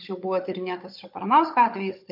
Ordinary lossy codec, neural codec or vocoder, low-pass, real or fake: MP3, 32 kbps; codec, 16 kHz, 16 kbps, FunCodec, trained on Chinese and English, 50 frames a second; 5.4 kHz; fake